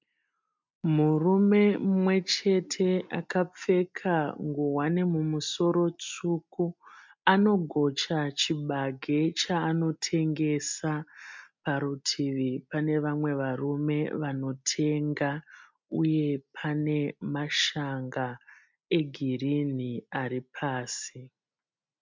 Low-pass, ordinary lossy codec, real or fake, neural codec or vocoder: 7.2 kHz; MP3, 64 kbps; real; none